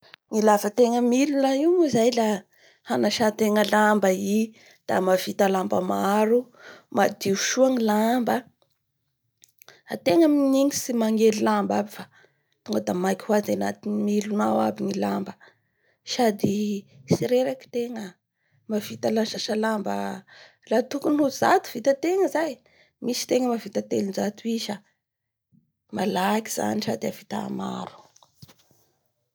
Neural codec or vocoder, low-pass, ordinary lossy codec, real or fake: none; none; none; real